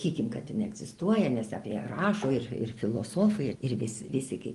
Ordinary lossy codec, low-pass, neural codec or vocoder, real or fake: Opus, 24 kbps; 10.8 kHz; none; real